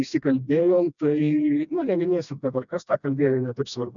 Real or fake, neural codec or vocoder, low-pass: fake; codec, 16 kHz, 1 kbps, FreqCodec, smaller model; 7.2 kHz